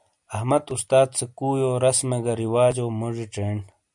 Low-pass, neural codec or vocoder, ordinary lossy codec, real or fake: 10.8 kHz; none; MP3, 96 kbps; real